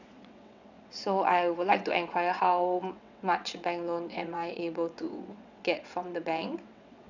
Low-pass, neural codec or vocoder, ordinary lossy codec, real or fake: 7.2 kHz; vocoder, 22.05 kHz, 80 mel bands, WaveNeXt; none; fake